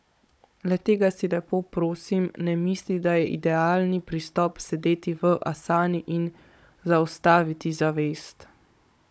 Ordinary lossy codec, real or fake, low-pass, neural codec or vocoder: none; fake; none; codec, 16 kHz, 16 kbps, FunCodec, trained on Chinese and English, 50 frames a second